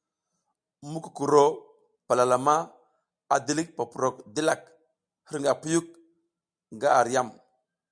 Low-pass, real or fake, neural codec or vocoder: 9.9 kHz; real; none